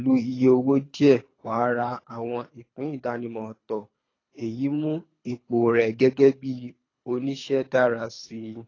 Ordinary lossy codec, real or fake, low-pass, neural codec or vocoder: AAC, 32 kbps; fake; 7.2 kHz; codec, 24 kHz, 3 kbps, HILCodec